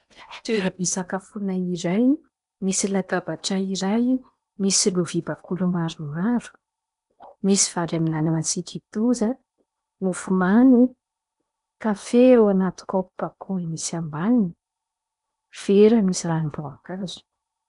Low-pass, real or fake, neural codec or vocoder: 10.8 kHz; fake; codec, 16 kHz in and 24 kHz out, 0.8 kbps, FocalCodec, streaming, 65536 codes